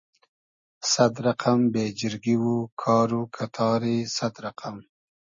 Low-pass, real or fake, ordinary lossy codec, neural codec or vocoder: 7.2 kHz; real; MP3, 32 kbps; none